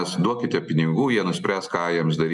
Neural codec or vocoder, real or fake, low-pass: none; real; 10.8 kHz